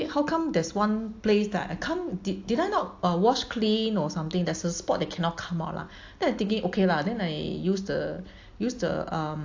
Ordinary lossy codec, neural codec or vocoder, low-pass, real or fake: AAC, 48 kbps; none; 7.2 kHz; real